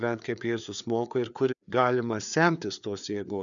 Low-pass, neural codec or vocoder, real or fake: 7.2 kHz; codec, 16 kHz, 4 kbps, FreqCodec, larger model; fake